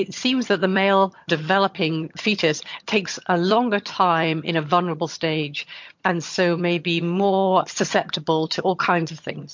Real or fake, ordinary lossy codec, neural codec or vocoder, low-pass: fake; MP3, 48 kbps; vocoder, 22.05 kHz, 80 mel bands, HiFi-GAN; 7.2 kHz